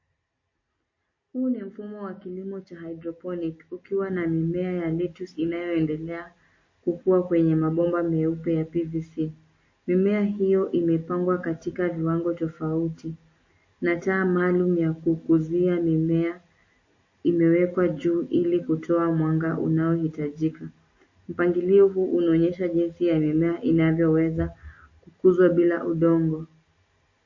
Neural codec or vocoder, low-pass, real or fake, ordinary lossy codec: none; 7.2 kHz; real; MP3, 32 kbps